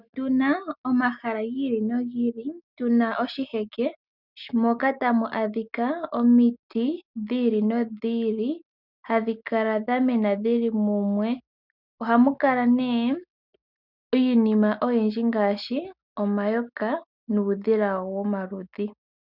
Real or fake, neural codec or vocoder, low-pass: real; none; 5.4 kHz